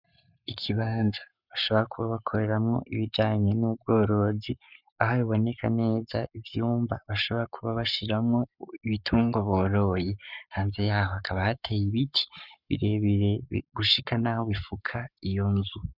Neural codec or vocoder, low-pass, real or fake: codec, 44.1 kHz, 7.8 kbps, Pupu-Codec; 5.4 kHz; fake